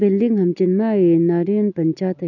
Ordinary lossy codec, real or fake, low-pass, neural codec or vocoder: none; real; 7.2 kHz; none